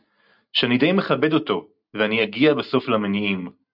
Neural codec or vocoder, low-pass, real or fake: none; 5.4 kHz; real